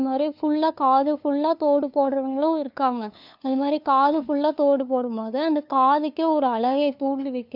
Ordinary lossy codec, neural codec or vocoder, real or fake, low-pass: none; codec, 16 kHz, 2 kbps, FunCodec, trained on LibriTTS, 25 frames a second; fake; 5.4 kHz